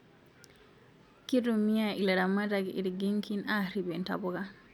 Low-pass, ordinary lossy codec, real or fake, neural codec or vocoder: 19.8 kHz; none; real; none